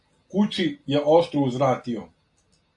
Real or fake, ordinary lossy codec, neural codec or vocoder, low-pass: real; AAC, 64 kbps; none; 10.8 kHz